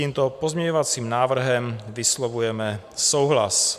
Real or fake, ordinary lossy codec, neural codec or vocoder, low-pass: real; MP3, 96 kbps; none; 14.4 kHz